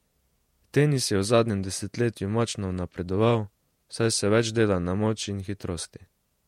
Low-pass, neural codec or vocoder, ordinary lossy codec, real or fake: 19.8 kHz; vocoder, 48 kHz, 128 mel bands, Vocos; MP3, 64 kbps; fake